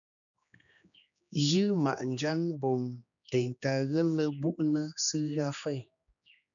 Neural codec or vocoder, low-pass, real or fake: codec, 16 kHz, 2 kbps, X-Codec, HuBERT features, trained on general audio; 7.2 kHz; fake